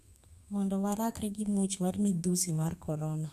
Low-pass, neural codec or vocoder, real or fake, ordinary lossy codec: 14.4 kHz; codec, 32 kHz, 1.9 kbps, SNAC; fake; AAC, 96 kbps